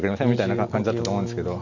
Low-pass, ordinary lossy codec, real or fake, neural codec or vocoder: 7.2 kHz; none; real; none